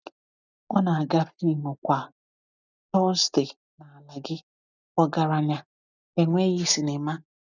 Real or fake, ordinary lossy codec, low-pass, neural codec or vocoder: real; none; 7.2 kHz; none